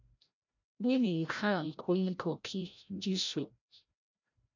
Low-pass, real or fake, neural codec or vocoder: 7.2 kHz; fake; codec, 16 kHz, 0.5 kbps, FreqCodec, larger model